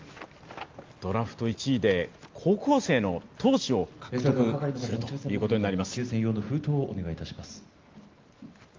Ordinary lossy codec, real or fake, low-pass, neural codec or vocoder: Opus, 32 kbps; real; 7.2 kHz; none